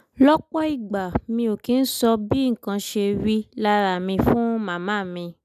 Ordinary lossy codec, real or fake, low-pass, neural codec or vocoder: none; real; 14.4 kHz; none